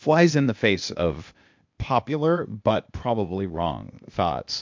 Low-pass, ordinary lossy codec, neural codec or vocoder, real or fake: 7.2 kHz; MP3, 64 kbps; codec, 16 kHz, 0.8 kbps, ZipCodec; fake